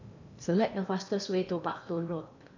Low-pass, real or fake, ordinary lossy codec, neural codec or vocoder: 7.2 kHz; fake; none; codec, 16 kHz in and 24 kHz out, 0.8 kbps, FocalCodec, streaming, 65536 codes